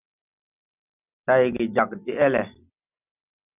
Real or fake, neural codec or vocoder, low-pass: real; none; 3.6 kHz